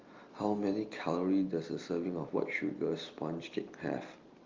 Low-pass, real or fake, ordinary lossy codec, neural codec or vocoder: 7.2 kHz; real; Opus, 32 kbps; none